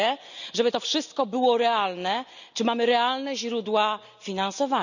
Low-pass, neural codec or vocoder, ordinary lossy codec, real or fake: 7.2 kHz; none; none; real